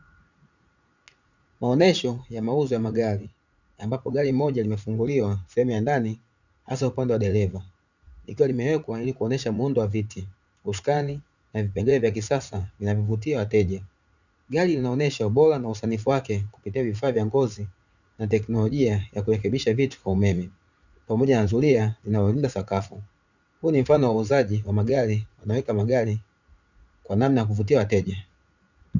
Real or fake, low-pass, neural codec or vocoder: fake; 7.2 kHz; vocoder, 44.1 kHz, 80 mel bands, Vocos